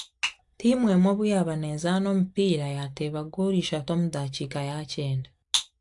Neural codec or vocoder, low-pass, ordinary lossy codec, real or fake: none; 10.8 kHz; AAC, 64 kbps; real